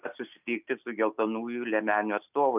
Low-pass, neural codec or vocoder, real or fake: 3.6 kHz; none; real